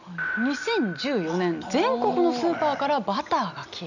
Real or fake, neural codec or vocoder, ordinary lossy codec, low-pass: real; none; none; 7.2 kHz